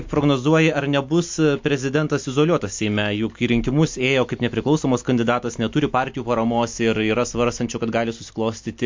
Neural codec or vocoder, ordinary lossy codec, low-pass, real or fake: none; MP3, 48 kbps; 7.2 kHz; real